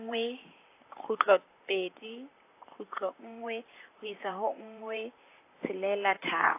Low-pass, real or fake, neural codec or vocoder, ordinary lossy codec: 3.6 kHz; fake; vocoder, 44.1 kHz, 128 mel bands, Pupu-Vocoder; AAC, 24 kbps